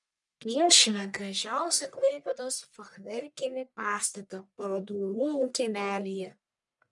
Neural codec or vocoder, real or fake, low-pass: codec, 44.1 kHz, 1.7 kbps, Pupu-Codec; fake; 10.8 kHz